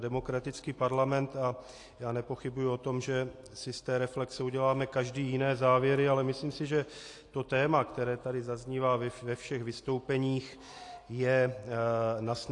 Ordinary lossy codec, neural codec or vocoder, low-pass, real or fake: AAC, 48 kbps; none; 10.8 kHz; real